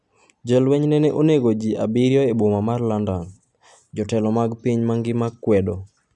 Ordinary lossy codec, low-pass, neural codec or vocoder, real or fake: none; 10.8 kHz; none; real